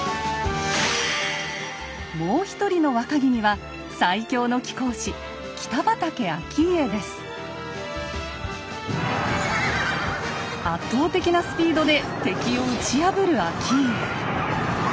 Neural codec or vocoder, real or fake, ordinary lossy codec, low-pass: none; real; none; none